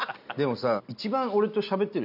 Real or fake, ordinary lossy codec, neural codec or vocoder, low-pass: real; none; none; 5.4 kHz